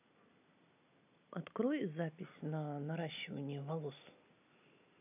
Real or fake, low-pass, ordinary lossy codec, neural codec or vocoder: real; 3.6 kHz; none; none